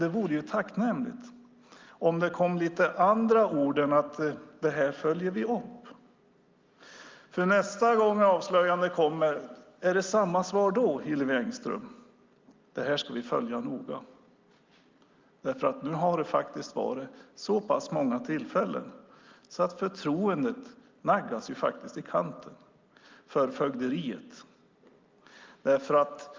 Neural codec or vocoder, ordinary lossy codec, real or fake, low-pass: none; Opus, 24 kbps; real; 7.2 kHz